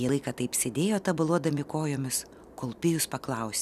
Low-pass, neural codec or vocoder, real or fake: 14.4 kHz; none; real